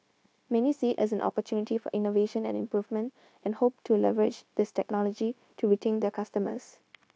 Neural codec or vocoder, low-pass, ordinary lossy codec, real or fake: codec, 16 kHz, 0.9 kbps, LongCat-Audio-Codec; none; none; fake